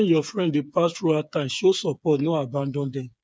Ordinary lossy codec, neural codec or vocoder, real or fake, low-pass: none; codec, 16 kHz, 8 kbps, FreqCodec, smaller model; fake; none